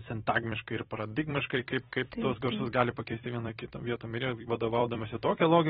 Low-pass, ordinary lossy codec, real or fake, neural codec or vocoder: 19.8 kHz; AAC, 16 kbps; fake; vocoder, 44.1 kHz, 128 mel bands every 256 samples, BigVGAN v2